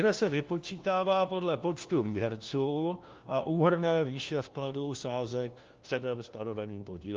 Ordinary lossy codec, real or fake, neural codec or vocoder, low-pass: Opus, 32 kbps; fake; codec, 16 kHz, 1 kbps, FunCodec, trained on LibriTTS, 50 frames a second; 7.2 kHz